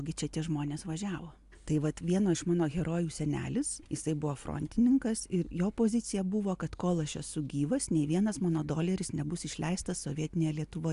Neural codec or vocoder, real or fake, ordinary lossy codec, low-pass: none; real; AAC, 96 kbps; 10.8 kHz